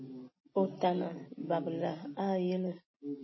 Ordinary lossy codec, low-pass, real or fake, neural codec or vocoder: MP3, 24 kbps; 7.2 kHz; real; none